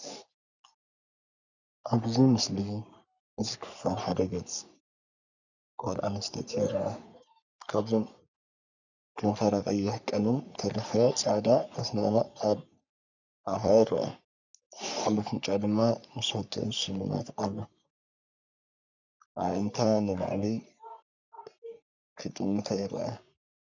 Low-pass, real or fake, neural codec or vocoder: 7.2 kHz; fake; codec, 44.1 kHz, 3.4 kbps, Pupu-Codec